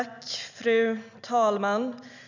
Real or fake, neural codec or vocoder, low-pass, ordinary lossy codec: real; none; 7.2 kHz; none